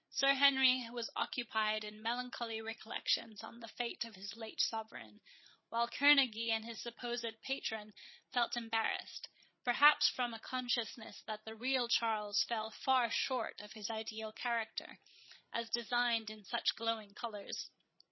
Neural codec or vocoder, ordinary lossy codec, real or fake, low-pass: codec, 16 kHz, 16 kbps, FreqCodec, larger model; MP3, 24 kbps; fake; 7.2 kHz